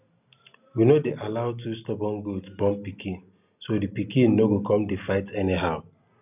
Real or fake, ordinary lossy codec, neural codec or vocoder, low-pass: real; none; none; 3.6 kHz